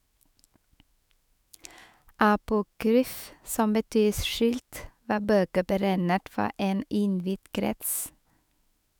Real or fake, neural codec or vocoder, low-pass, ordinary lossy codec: fake; autoencoder, 48 kHz, 128 numbers a frame, DAC-VAE, trained on Japanese speech; none; none